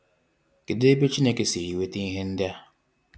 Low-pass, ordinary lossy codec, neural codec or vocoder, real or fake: none; none; none; real